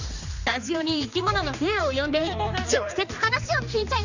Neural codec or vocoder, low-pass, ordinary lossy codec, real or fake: codec, 16 kHz, 2 kbps, X-Codec, HuBERT features, trained on general audio; 7.2 kHz; none; fake